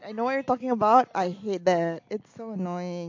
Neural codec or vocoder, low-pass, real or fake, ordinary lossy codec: none; 7.2 kHz; real; AAC, 48 kbps